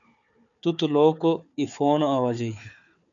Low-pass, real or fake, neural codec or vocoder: 7.2 kHz; fake; codec, 16 kHz, 4 kbps, FunCodec, trained on Chinese and English, 50 frames a second